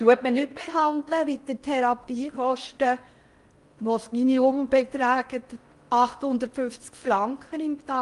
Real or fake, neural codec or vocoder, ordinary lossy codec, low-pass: fake; codec, 16 kHz in and 24 kHz out, 0.6 kbps, FocalCodec, streaming, 4096 codes; Opus, 32 kbps; 10.8 kHz